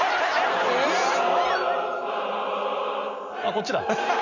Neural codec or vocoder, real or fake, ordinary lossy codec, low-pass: none; real; none; 7.2 kHz